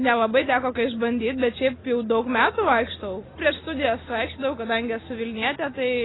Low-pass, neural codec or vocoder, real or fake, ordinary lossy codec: 7.2 kHz; none; real; AAC, 16 kbps